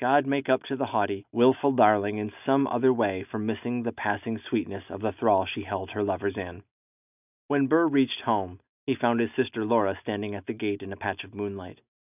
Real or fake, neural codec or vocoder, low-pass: real; none; 3.6 kHz